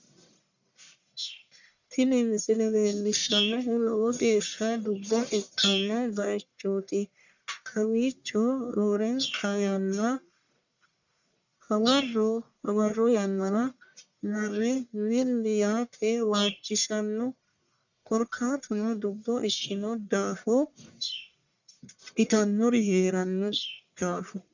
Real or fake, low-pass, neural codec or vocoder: fake; 7.2 kHz; codec, 44.1 kHz, 1.7 kbps, Pupu-Codec